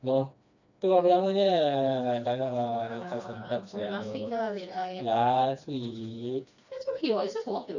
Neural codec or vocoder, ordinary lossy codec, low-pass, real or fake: codec, 16 kHz, 2 kbps, FreqCodec, smaller model; none; 7.2 kHz; fake